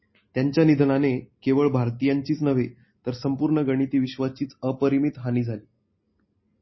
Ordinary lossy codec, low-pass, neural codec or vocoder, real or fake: MP3, 24 kbps; 7.2 kHz; none; real